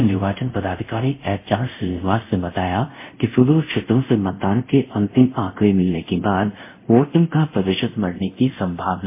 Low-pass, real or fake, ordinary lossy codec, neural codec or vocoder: 3.6 kHz; fake; MP3, 24 kbps; codec, 24 kHz, 0.5 kbps, DualCodec